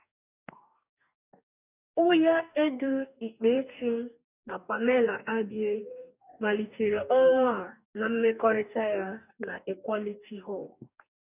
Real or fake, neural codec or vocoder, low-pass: fake; codec, 44.1 kHz, 2.6 kbps, DAC; 3.6 kHz